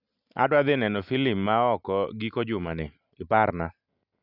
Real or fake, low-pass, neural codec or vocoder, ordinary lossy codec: real; 5.4 kHz; none; none